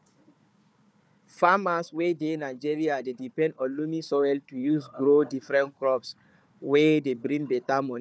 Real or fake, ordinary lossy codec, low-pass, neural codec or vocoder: fake; none; none; codec, 16 kHz, 4 kbps, FunCodec, trained on Chinese and English, 50 frames a second